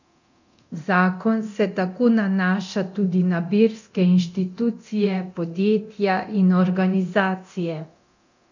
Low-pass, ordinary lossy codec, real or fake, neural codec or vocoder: 7.2 kHz; none; fake; codec, 24 kHz, 0.9 kbps, DualCodec